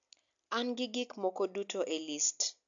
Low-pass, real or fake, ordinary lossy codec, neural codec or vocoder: 7.2 kHz; real; AAC, 64 kbps; none